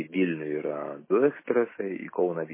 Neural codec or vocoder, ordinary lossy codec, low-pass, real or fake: none; MP3, 16 kbps; 3.6 kHz; real